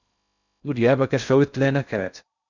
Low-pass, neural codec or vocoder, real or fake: 7.2 kHz; codec, 16 kHz in and 24 kHz out, 0.6 kbps, FocalCodec, streaming, 2048 codes; fake